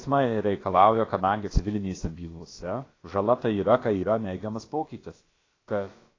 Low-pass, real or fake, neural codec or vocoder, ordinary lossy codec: 7.2 kHz; fake; codec, 16 kHz, about 1 kbps, DyCAST, with the encoder's durations; AAC, 32 kbps